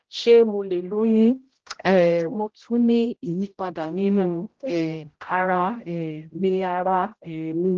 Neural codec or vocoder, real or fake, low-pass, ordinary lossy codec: codec, 16 kHz, 0.5 kbps, X-Codec, HuBERT features, trained on general audio; fake; 7.2 kHz; Opus, 16 kbps